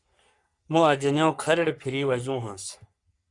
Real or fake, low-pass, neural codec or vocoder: fake; 10.8 kHz; codec, 44.1 kHz, 3.4 kbps, Pupu-Codec